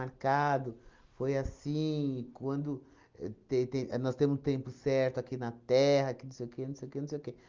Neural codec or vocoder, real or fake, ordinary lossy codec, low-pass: none; real; Opus, 24 kbps; 7.2 kHz